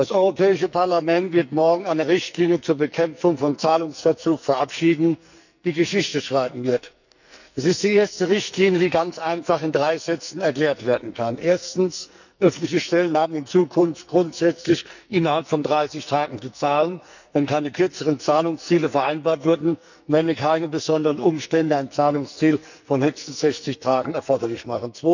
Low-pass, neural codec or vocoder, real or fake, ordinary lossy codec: 7.2 kHz; codec, 44.1 kHz, 2.6 kbps, SNAC; fake; none